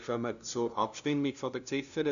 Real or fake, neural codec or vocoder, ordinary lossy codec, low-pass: fake; codec, 16 kHz, 0.5 kbps, FunCodec, trained on LibriTTS, 25 frames a second; none; 7.2 kHz